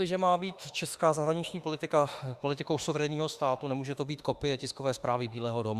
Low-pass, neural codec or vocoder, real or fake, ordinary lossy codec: 14.4 kHz; autoencoder, 48 kHz, 32 numbers a frame, DAC-VAE, trained on Japanese speech; fake; Opus, 64 kbps